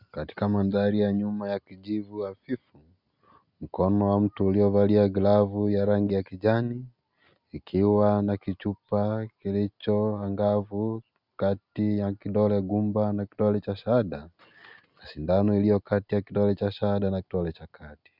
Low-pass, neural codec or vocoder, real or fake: 5.4 kHz; none; real